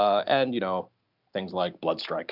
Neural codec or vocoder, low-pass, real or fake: none; 5.4 kHz; real